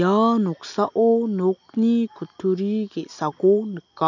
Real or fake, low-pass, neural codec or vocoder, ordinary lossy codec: real; 7.2 kHz; none; none